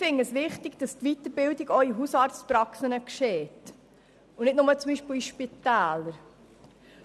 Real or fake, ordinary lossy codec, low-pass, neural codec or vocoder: real; none; none; none